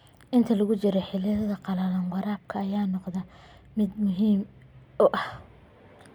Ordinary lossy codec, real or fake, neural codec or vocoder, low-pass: none; real; none; 19.8 kHz